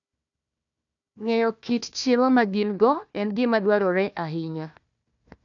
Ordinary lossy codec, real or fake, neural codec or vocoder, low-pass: none; fake; codec, 16 kHz, 1 kbps, FunCodec, trained on Chinese and English, 50 frames a second; 7.2 kHz